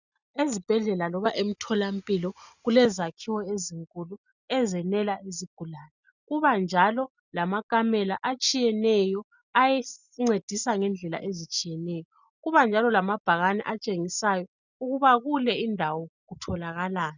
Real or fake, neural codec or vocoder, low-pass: real; none; 7.2 kHz